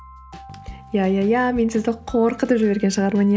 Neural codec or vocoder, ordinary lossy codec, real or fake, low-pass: none; none; real; none